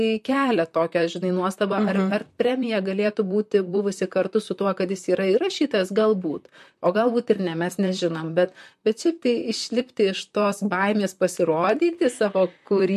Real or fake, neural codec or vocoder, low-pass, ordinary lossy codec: fake; vocoder, 44.1 kHz, 128 mel bands, Pupu-Vocoder; 14.4 kHz; MP3, 64 kbps